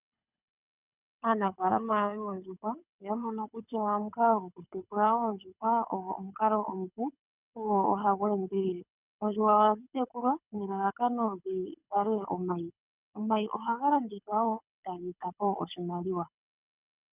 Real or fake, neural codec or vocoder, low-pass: fake; codec, 24 kHz, 6 kbps, HILCodec; 3.6 kHz